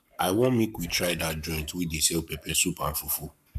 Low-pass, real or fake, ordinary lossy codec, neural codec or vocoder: 14.4 kHz; fake; none; codec, 44.1 kHz, 7.8 kbps, Pupu-Codec